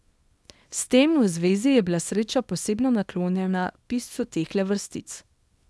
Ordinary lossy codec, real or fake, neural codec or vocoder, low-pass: none; fake; codec, 24 kHz, 0.9 kbps, WavTokenizer, small release; none